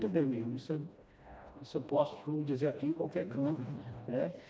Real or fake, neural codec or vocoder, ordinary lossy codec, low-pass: fake; codec, 16 kHz, 1 kbps, FreqCodec, smaller model; none; none